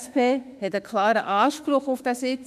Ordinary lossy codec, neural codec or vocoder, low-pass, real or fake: none; autoencoder, 48 kHz, 32 numbers a frame, DAC-VAE, trained on Japanese speech; 14.4 kHz; fake